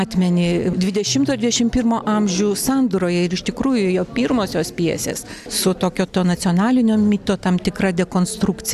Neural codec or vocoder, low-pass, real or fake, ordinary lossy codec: none; 14.4 kHz; real; AAC, 96 kbps